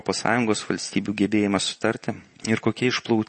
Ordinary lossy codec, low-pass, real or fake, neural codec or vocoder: MP3, 32 kbps; 10.8 kHz; real; none